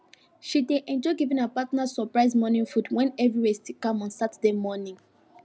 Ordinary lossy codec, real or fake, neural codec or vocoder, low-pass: none; real; none; none